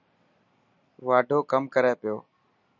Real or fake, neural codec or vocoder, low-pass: real; none; 7.2 kHz